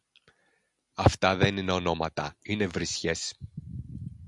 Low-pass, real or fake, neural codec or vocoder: 10.8 kHz; real; none